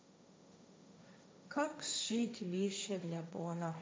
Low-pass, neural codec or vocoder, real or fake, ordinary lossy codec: none; codec, 16 kHz, 1.1 kbps, Voila-Tokenizer; fake; none